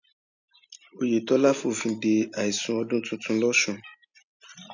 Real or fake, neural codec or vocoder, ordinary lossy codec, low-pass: real; none; none; 7.2 kHz